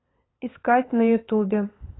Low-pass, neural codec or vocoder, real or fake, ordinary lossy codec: 7.2 kHz; codec, 16 kHz, 8 kbps, FunCodec, trained on LibriTTS, 25 frames a second; fake; AAC, 16 kbps